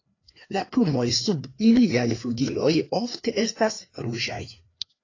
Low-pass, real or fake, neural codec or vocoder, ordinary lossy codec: 7.2 kHz; fake; codec, 16 kHz, 2 kbps, FreqCodec, larger model; AAC, 32 kbps